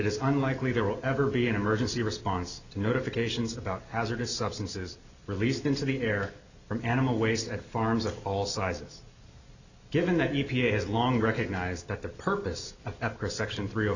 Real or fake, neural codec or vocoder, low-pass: real; none; 7.2 kHz